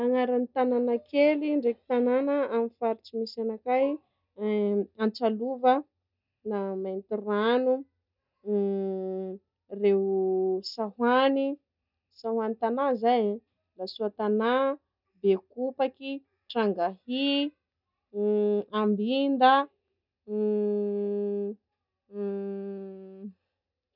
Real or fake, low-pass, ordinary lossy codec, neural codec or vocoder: real; 5.4 kHz; none; none